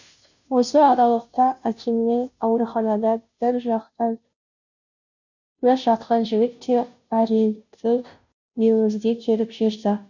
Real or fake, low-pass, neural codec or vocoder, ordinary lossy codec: fake; 7.2 kHz; codec, 16 kHz, 0.5 kbps, FunCodec, trained on Chinese and English, 25 frames a second; none